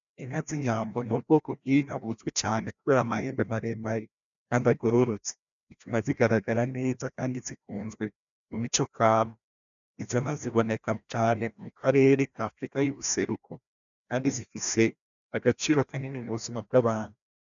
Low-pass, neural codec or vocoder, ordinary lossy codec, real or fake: 7.2 kHz; codec, 16 kHz, 1 kbps, FreqCodec, larger model; MP3, 96 kbps; fake